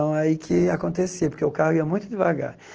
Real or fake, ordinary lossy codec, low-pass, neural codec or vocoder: real; Opus, 16 kbps; 7.2 kHz; none